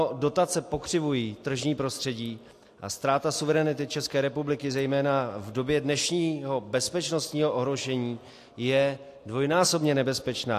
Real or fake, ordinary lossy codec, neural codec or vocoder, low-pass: real; AAC, 64 kbps; none; 14.4 kHz